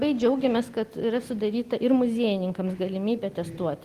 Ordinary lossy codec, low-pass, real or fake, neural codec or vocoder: Opus, 24 kbps; 14.4 kHz; real; none